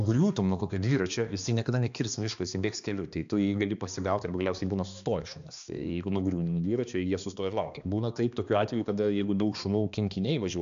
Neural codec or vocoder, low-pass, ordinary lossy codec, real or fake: codec, 16 kHz, 2 kbps, X-Codec, HuBERT features, trained on balanced general audio; 7.2 kHz; AAC, 96 kbps; fake